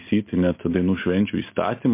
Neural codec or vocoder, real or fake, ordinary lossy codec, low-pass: none; real; MP3, 24 kbps; 3.6 kHz